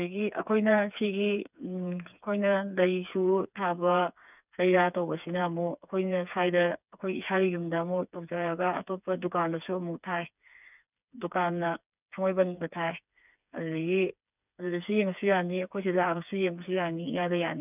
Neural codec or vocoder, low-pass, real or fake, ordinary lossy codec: codec, 16 kHz, 4 kbps, FreqCodec, smaller model; 3.6 kHz; fake; none